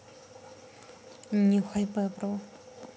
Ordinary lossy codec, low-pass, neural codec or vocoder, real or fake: none; none; none; real